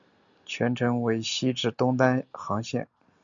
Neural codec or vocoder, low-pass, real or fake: none; 7.2 kHz; real